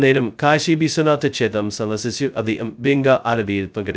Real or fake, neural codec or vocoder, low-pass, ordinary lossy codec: fake; codec, 16 kHz, 0.2 kbps, FocalCodec; none; none